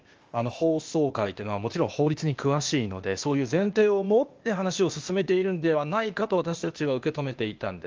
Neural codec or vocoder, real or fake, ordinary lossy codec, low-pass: codec, 16 kHz, 0.8 kbps, ZipCodec; fake; Opus, 24 kbps; 7.2 kHz